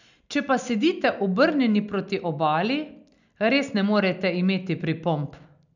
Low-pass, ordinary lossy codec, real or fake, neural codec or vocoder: 7.2 kHz; none; real; none